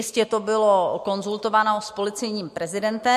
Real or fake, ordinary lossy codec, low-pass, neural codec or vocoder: real; MP3, 64 kbps; 14.4 kHz; none